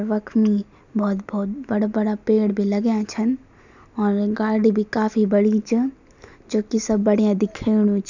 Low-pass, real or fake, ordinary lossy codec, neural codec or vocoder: 7.2 kHz; real; none; none